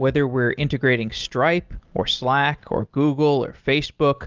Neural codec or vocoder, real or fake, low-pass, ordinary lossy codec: none; real; 7.2 kHz; Opus, 32 kbps